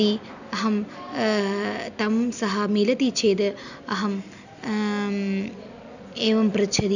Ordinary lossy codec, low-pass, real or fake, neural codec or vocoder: none; 7.2 kHz; real; none